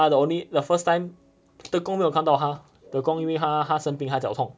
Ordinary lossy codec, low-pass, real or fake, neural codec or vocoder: none; none; real; none